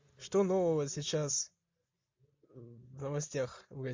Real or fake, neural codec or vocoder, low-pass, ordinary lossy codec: real; none; 7.2 kHz; MP3, 48 kbps